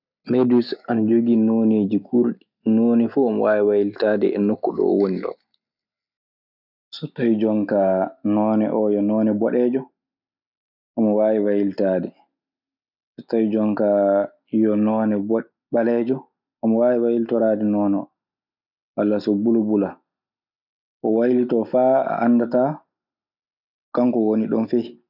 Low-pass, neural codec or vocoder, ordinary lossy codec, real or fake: 5.4 kHz; none; none; real